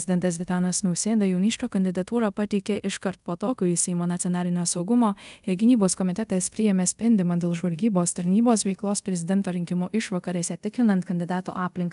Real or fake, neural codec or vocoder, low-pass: fake; codec, 24 kHz, 0.5 kbps, DualCodec; 10.8 kHz